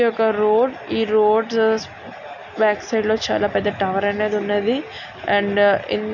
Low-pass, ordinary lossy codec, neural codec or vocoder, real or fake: 7.2 kHz; none; none; real